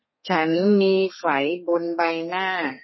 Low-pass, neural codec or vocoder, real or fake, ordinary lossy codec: 7.2 kHz; codec, 32 kHz, 1.9 kbps, SNAC; fake; MP3, 24 kbps